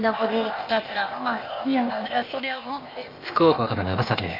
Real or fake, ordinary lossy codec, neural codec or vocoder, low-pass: fake; MP3, 32 kbps; codec, 16 kHz, 0.8 kbps, ZipCodec; 5.4 kHz